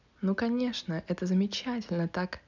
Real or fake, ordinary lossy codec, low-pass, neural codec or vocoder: real; none; 7.2 kHz; none